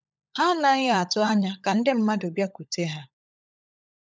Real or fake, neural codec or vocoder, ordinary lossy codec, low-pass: fake; codec, 16 kHz, 16 kbps, FunCodec, trained on LibriTTS, 50 frames a second; none; none